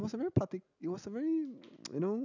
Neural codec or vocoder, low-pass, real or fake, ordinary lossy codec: none; 7.2 kHz; real; none